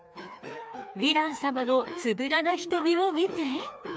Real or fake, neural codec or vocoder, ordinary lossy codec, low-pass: fake; codec, 16 kHz, 2 kbps, FreqCodec, larger model; none; none